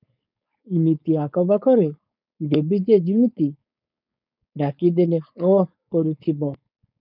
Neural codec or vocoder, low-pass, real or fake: codec, 16 kHz, 4.8 kbps, FACodec; 5.4 kHz; fake